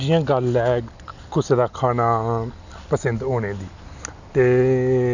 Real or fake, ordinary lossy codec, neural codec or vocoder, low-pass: real; none; none; 7.2 kHz